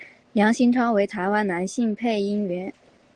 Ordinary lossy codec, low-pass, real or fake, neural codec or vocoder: Opus, 16 kbps; 10.8 kHz; real; none